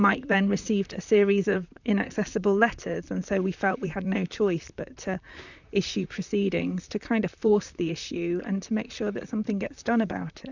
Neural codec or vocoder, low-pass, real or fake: vocoder, 44.1 kHz, 128 mel bands, Pupu-Vocoder; 7.2 kHz; fake